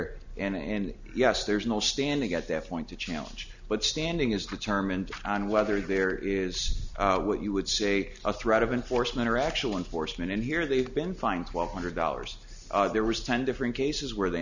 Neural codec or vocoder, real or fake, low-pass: none; real; 7.2 kHz